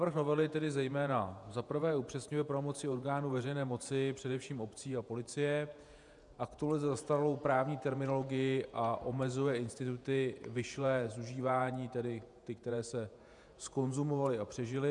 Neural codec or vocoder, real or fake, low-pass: vocoder, 48 kHz, 128 mel bands, Vocos; fake; 10.8 kHz